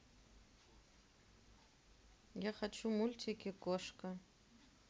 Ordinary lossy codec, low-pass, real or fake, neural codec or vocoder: none; none; real; none